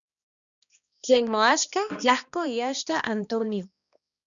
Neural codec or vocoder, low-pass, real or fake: codec, 16 kHz, 1 kbps, X-Codec, HuBERT features, trained on balanced general audio; 7.2 kHz; fake